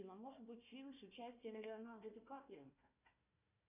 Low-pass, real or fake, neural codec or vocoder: 3.6 kHz; fake; codec, 16 kHz, 1 kbps, FunCodec, trained on Chinese and English, 50 frames a second